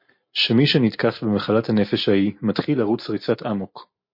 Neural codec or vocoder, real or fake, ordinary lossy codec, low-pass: none; real; MP3, 32 kbps; 5.4 kHz